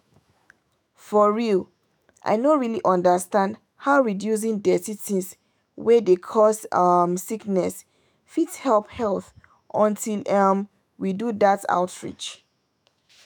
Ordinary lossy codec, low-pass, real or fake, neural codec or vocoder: none; none; fake; autoencoder, 48 kHz, 128 numbers a frame, DAC-VAE, trained on Japanese speech